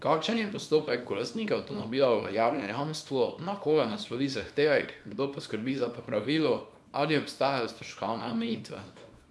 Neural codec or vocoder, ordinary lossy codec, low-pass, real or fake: codec, 24 kHz, 0.9 kbps, WavTokenizer, small release; none; none; fake